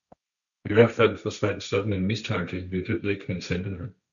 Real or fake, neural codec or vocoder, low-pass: fake; codec, 16 kHz, 1.1 kbps, Voila-Tokenizer; 7.2 kHz